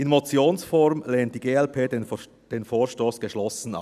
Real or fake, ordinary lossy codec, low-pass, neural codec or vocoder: real; none; 14.4 kHz; none